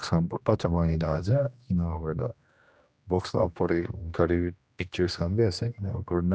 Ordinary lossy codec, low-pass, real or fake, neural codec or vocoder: none; none; fake; codec, 16 kHz, 1 kbps, X-Codec, HuBERT features, trained on general audio